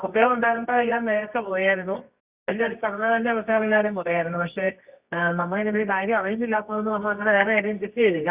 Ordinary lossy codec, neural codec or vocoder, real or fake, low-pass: Opus, 64 kbps; codec, 24 kHz, 0.9 kbps, WavTokenizer, medium music audio release; fake; 3.6 kHz